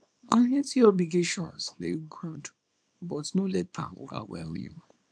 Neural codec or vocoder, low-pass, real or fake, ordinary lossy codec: codec, 24 kHz, 0.9 kbps, WavTokenizer, small release; 9.9 kHz; fake; none